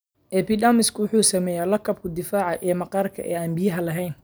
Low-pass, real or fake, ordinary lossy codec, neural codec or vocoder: none; real; none; none